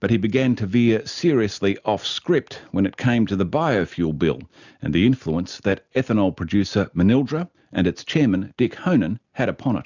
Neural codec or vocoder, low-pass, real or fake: none; 7.2 kHz; real